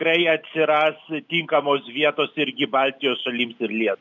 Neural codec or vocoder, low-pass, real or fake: none; 7.2 kHz; real